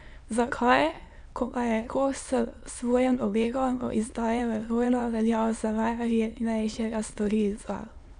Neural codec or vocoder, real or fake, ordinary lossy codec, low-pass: autoencoder, 22.05 kHz, a latent of 192 numbers a frame, VITS, trained on many speakers; fake; none; 9.9 kHz